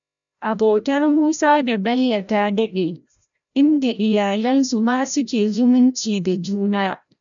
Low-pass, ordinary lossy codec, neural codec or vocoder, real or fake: 7.2 kHz; none; codec, 16 kHz, 0.5 kbps, FreqCodec, larger model; fake